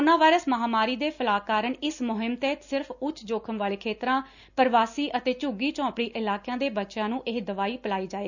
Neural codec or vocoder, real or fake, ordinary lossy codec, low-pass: none; real; none; 7.2 kHz